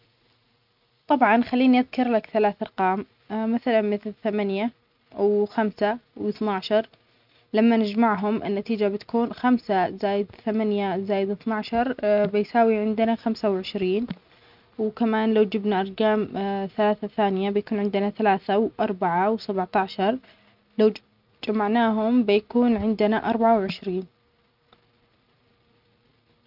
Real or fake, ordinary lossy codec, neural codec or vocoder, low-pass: real; none; none; 5.4 kHz